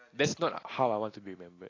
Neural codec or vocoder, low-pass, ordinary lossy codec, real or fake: none; 7.2 kHz; AAC, 32 kbps; real